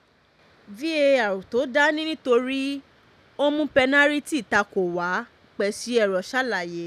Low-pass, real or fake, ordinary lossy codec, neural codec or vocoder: 14.4 kHz; real; none; none